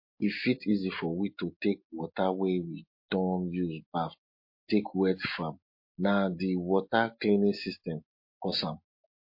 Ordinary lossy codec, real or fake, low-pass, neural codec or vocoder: MP3, 32 kbps; real; 5.4 kHz; none